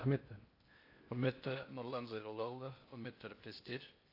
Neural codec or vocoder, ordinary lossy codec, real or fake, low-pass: codec, 16 kHz in and 24 kHz out, 0.8 kbps, FocalCodec, streaming, 65536 codes; AAC, 32 kbps; fake; 5.4 kHz